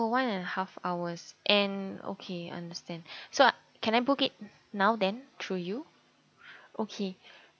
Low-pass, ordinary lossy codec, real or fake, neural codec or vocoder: none; none; real; none